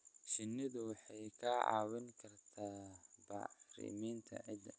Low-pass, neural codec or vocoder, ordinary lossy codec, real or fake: none; none; none; real